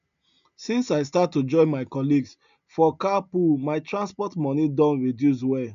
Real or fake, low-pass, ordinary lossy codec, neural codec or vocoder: real; 7.2 kHz; none; none